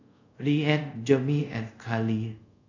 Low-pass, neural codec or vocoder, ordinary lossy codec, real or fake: 7.2 kHz; codec, 24 kHz, 0.5 kbps, DualCodec; MP3, 64 kbps; fake